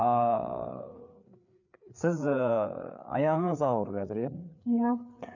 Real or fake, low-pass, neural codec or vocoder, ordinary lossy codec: fake; 7.2 kHz; codec, 16 kHz, 4 kbps, FreqCodec, larger model; none